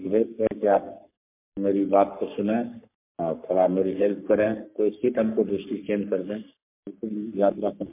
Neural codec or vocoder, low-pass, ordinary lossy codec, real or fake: codec, 44.1 kHz, 3.4 kbps, Pupu-Codec; 3.6 kHz; MP3, 32 kbps; fake